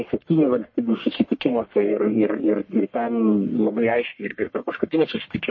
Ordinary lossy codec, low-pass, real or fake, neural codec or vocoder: MP3, 32 kbps; 7.2 kHz; fake; codec, 44.1 kHz, 1.7 kbps, Pupu-Codec